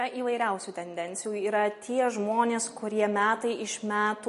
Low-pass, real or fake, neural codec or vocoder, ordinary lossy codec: 14.4 kHz; real; none; MP3, 48 kbps